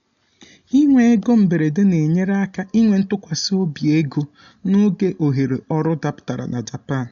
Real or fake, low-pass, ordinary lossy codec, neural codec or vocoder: real; 7.2 kHz; none; none